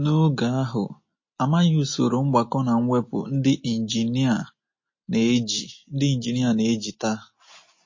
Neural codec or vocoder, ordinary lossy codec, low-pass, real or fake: none; MP3, 32 kbps; 7.2 kHz; real